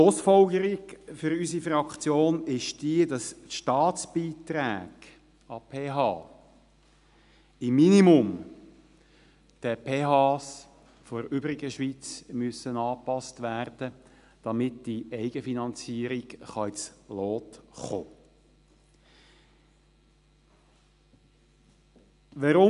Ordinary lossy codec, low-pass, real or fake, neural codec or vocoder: none; 10.8 kHz; real; none